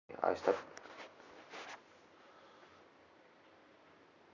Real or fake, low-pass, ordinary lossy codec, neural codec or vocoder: real; 7.2 kHz; none; none